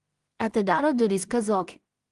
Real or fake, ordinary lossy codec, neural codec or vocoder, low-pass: fake; Opus, 24 kbps; codec, 16 kHz in and 24 kHz out, 0.4 kbps, LongCat-Audio-Codec, two codebook decoder; 10.8 kHz